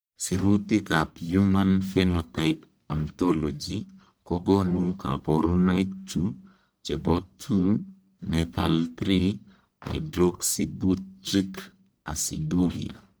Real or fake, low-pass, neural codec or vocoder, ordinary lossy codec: fake; none; codec, 44.1 kHz, 1.7 kbps, Pupu-Codec; none